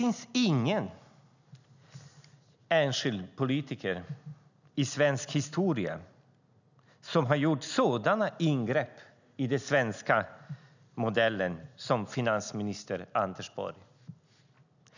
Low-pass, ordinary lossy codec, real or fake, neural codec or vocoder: 7.2 kHz; none; real; none